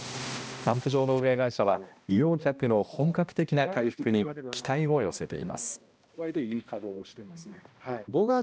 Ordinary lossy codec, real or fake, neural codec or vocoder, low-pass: none; fake; codec, 16 kHz, 1 kbps, X-Codec, HuBERT features, trained on balanced general audio; none